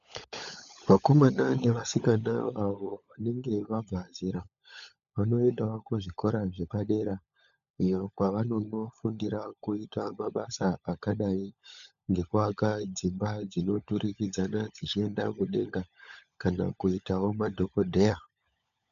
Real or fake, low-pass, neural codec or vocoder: fake; 7.2 kHz; codec, 16 kHz, 16 kbps, FunCodec, trained on LibriTTS, 50 frames a second